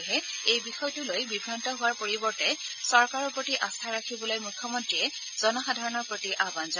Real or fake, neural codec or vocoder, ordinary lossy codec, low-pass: real; none; none; 7.2 kHz